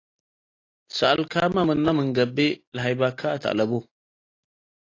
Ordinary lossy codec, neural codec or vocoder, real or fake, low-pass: AAC, 32 kbps; none; real; 7.2 kHz